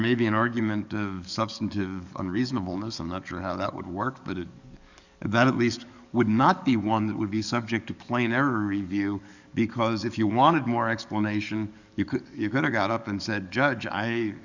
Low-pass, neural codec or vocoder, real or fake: 7.2 kHz; codec, 44.1 kHz, 7.8 kbps, DAC; fake